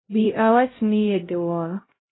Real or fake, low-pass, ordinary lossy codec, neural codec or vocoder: fake; 7.2 kHz; AAC, 16 kbps; codec, 16 kHz, 0.5 kbps, X-Codec, HuBERT features, trained on LibriSpeech